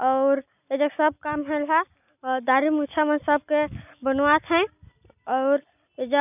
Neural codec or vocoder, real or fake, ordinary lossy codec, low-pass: none; real; none; 3.6 kHz